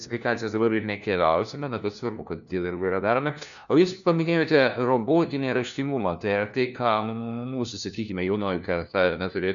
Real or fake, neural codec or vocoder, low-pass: fake; codec, 16 kHz, 1 kbps, FunCodec, trained on LibriTTS, 50 frames a second; 7.2 kHz